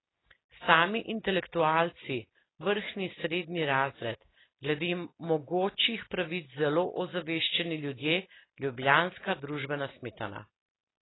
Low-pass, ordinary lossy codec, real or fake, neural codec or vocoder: 7.2 kHz; AAC, 16 kbps; real; none